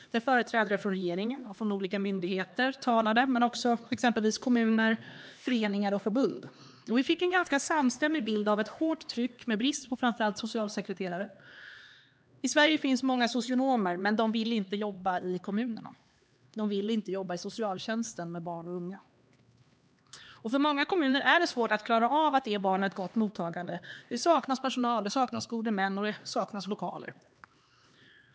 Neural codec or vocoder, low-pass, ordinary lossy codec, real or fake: codec, 16 kHz, 2 kbps, X-Codec, HuBERT features, trained on LibriSpeech; none; none; fake